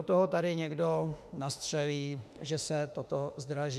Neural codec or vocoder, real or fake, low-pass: autoencoder, 48 kHz, 32 numbers a frame, DAC-VAE, trained on Japanese speech; fake; 14.4 kHz